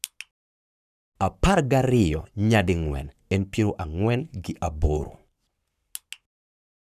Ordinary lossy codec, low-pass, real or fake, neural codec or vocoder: none; 14.4 kHz; fake; codec, 44.1 kHz, 7.8 kbps, Pupu-Codec